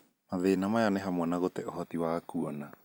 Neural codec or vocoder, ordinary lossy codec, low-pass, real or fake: none; none; none; real